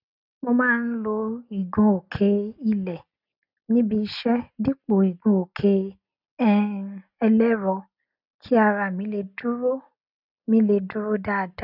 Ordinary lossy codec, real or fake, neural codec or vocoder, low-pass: none; real; none; 5.4 kHz